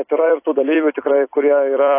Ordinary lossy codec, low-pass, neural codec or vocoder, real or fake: AAC, 24 kbps; 3.6 kHz; vocoder, 44.1 kHz, 128 mel bands every 256 samples, BigVGAN v2; fake